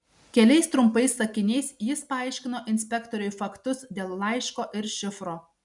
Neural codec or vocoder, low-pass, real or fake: none; 10.8 kHz; real